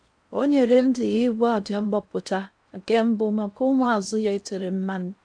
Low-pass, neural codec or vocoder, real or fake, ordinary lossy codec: 9.9 kHz; codec, 16 kHz in and 24 kHz out, 0.6 kbps, FocalCodec, streaming, 4096 codes; fake; none